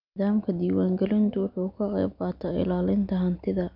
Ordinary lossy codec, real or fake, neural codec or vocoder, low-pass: none; real; none; 5.4 kHz